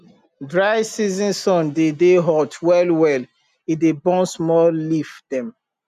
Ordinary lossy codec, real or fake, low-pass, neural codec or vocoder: none; real; 14.4 kHz; none